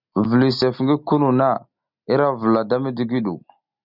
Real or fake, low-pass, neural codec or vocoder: real; 5.4 kHz; none